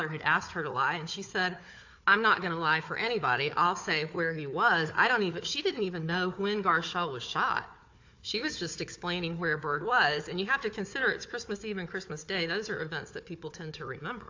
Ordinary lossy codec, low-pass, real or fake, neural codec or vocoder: AAC, 48 kbps; 7.2 kHz; fake; codec, 16 kHz, 4 kbps, FunCodec, trained on Chinese and English, 50 frames a second